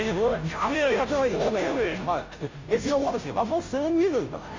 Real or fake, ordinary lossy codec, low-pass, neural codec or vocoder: fake; MP3, 64 kbps; 7.2 kHz; codec, 16 kHz, 0.5 kbps, FunCodec, trained on Chinese and English, 25 frames a second